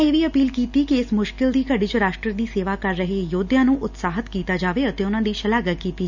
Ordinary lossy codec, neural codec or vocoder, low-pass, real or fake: none; none; 7.2 kHz; real